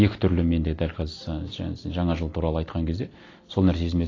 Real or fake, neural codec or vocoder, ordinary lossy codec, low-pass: real; none; AAC, 32 kbps; 7.2 kHz